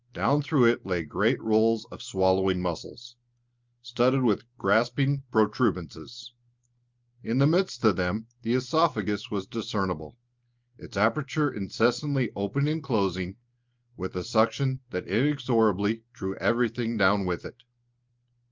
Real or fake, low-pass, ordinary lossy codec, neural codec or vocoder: real; 7.2 kHz; Opus, 24 kbps; none